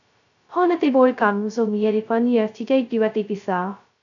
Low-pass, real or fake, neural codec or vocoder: 7.2 kHz; fake; codec, 16 kHz, 0.2 kbps, FocalCodec